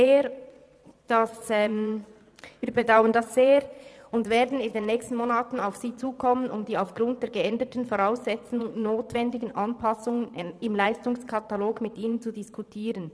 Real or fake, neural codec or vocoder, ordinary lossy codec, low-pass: fake; vocoder, 22.05 kHz, 80 mel bands, Vocos; none; none